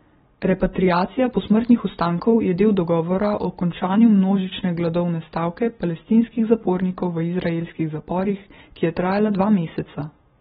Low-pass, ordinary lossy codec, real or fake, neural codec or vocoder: 7.2 kHz; AAC, 16 kbps; real; none